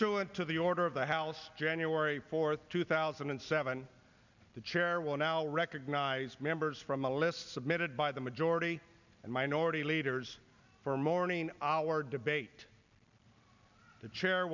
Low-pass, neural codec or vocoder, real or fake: 7.2 kHz; none; real